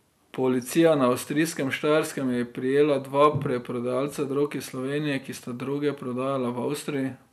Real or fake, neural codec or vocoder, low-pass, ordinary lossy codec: real; none; 14.4 kHz; none